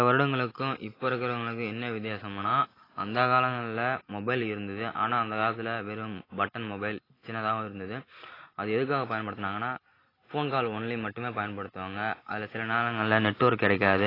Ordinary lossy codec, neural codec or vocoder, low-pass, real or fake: AAC, 24 kbps; none; 5.4 kHz; real